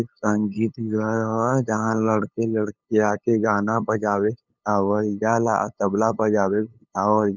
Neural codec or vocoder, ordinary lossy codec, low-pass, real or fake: codec, 16 kHz, 8 kbps, FunCodec, trained on LibriTTS, 25 frames a second; none; 7.2 kHz; fake